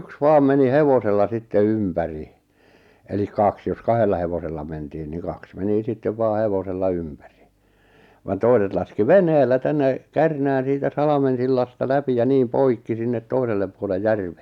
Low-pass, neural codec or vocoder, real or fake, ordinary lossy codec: 19.8 kHz; none; real; none